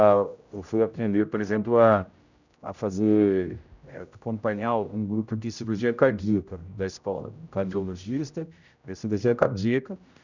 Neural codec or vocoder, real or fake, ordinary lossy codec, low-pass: codec, 16 kHz, 0.5 kbps, X-Codec, HuBERT features, trained on general audio; fake; none; 7.2 kHz